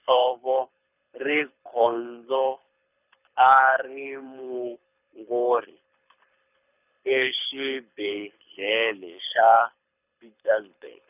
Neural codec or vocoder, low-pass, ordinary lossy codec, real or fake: codec, 24 kHz, 6 kbps, HILCodec; 3.6 kHz; none; fake